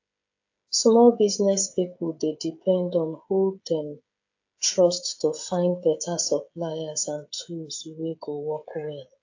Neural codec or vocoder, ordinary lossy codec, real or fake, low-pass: codec, 16 kHz, 16 kbps, FreqCodec, smaller model; AAC, 48 kbps; fake; 7.2 kHz